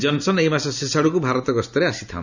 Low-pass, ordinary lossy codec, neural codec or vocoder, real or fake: 7.2 kHz; none; none; real